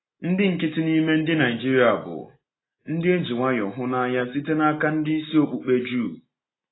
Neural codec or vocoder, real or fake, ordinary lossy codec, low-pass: none; real; AAC, 16 kbps; 7.2 kHz